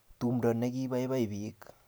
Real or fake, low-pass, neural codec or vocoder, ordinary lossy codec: real; none; none; none